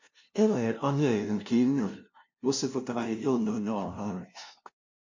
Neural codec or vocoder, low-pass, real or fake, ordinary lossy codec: codec, 16 kHz, 0.5 kbps, FunCodec, trained on LibriTTS, 25 frames a second; 7.2 kHz; fake; MP3, 48 kbps